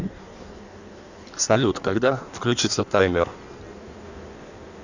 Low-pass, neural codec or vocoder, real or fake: 7.2 kHz; codec, 16 kHz in and 24 kHz out, 1.1 kbps, FireRedTTS-2 codec; fake